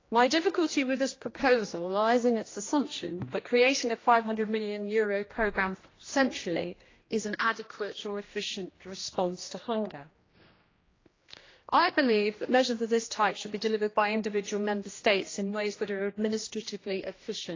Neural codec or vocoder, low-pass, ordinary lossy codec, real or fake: codec, 16 kHz, 1 kbps, X-Codec, HuBERT features, trained on general audio; 7.2 kHz; AAC, 32 kbps; fake